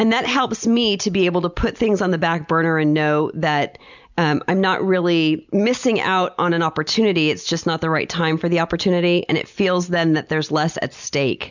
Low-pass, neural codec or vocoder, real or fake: 7.2 kHz; none; real